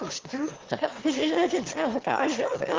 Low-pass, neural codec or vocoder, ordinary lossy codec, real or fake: 7.2 kHz; autoencoder, 22.05 kHz, a latent of 192 numbers a frame, VITS, trained on one speaker; Opus, 16 kbps; fake